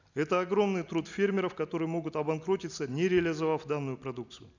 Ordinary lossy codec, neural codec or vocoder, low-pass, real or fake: none; none; 7.2 kHz; real